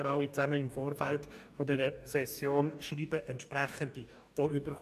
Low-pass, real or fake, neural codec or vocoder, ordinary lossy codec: 14.4 kHz; fake; codec, 44.1 kHz, 2.6 kbps, DAC; none